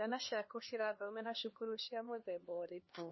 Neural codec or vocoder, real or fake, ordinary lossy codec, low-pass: codec, 16 kHz, 2 kbps, X-Codec, HuBERT features, trained on LibriSpeech; fake; MP3, 24 kbps; 7.2 kHz